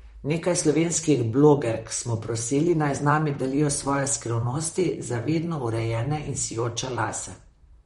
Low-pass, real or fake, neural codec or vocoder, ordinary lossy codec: 19.8 kHz; fake; vocoder, 44.1 kHz, 128 mel bands, Pupu-Vocoder; MP3, 48 kbps